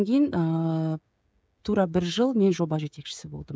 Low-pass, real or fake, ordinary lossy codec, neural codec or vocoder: none; fake; none; codec, 16 kHz, 16 kbps, FreqCodec, smaller model